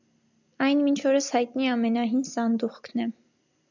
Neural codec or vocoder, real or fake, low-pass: none; real; 7.2 kHz